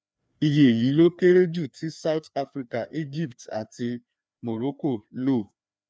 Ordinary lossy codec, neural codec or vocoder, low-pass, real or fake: none; codec, 16 kHz, 2 kbps, FreqCodec, larger model; none; fake